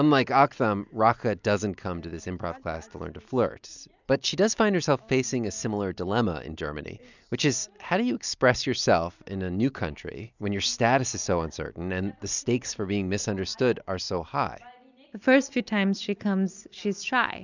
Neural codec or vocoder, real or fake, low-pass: none; real; 7.2 kHz